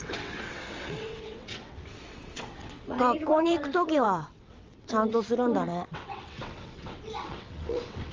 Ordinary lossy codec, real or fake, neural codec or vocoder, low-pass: Opus, 32 kbps; fake; vocoder, 22.05 kHz, 80 mel bands, WaveNeXt; 7.2 kHz